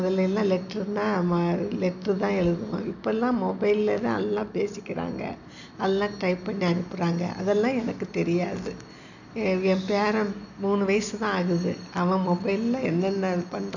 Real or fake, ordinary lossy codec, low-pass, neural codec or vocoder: real; none; 7.2 kHz; none